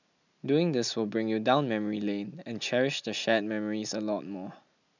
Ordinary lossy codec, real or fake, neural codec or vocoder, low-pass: none; real; none; 7.2 kHz